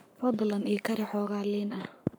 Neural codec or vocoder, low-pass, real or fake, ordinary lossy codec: codec, 44.1 kHz, 7.8 kbps, Pupu-Codec; none; fake; none